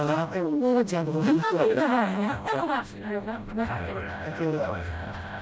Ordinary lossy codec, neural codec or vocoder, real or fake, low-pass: none; codec, 16 kHz, 0.5 kbps, FreqCodec, smaller model; fake; none